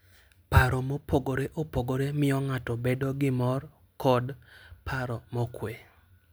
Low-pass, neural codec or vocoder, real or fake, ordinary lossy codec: none; none; real; none